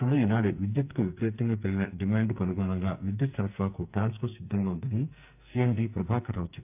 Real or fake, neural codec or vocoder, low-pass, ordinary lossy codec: fake; codec, 44.1 kHz, 2.6 kbps, SNAC; 3.6 kHz; AAC, 32 kbps